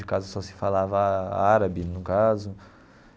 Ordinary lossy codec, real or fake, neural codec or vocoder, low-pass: none; real; none; none